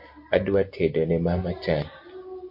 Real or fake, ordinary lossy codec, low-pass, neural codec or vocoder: real; MP3, 32 kbps; 5.4 kHz; none